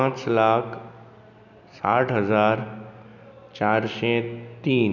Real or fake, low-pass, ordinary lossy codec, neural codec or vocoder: real; 7.2 kHz; none; none